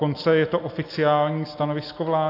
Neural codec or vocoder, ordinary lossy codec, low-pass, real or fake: none; AAC, 32 kbps; 5.4 kHz; real